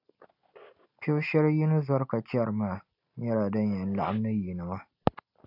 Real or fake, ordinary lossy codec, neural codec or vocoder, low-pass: real; AAC, 48 kbps; none; 5.4 kHz